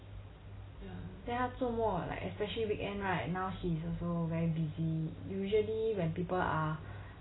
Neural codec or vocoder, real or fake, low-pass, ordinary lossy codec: none; real; 7.2 kHz; AAC, 16 kbps